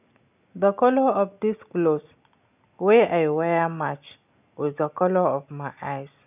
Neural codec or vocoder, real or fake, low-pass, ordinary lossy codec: none; real; 3.6 kHz; none